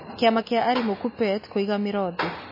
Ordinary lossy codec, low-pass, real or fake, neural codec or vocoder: MP3, 24 kbps; 5.4 kHz; real; none